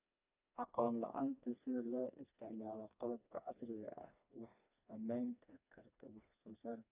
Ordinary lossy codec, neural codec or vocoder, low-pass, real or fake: none; codec, 16 kHz, 2 kbps, FreqCodec, smaller model; 3.6 kHz; fake